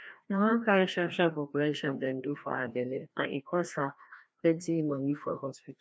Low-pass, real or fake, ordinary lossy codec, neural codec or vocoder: none; fake; none; codec, 16 kHz, 1 kbps, FreqCodec, larger model